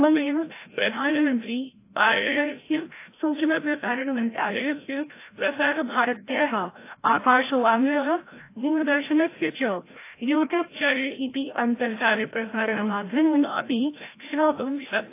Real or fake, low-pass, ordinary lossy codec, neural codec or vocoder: fake; 3.6 kHz; AAC, 24 kbps; codec, 16 kHz, 0.5 kbps, FreqCodec, larger model